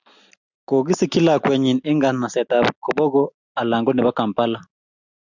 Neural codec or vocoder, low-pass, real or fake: none; 7.2 kHz; real